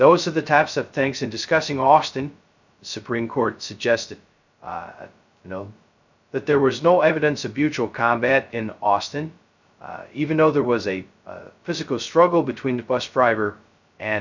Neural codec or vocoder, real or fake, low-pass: codec, 16 kHz, 0.2 kbps, FocalCodec; fake; 7.2 kHz